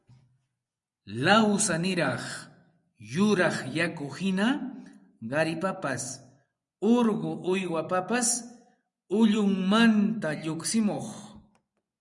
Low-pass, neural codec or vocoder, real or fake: 10.8 kHz; vocoder, 24 kHz, 100 mel bands, Vocos; fake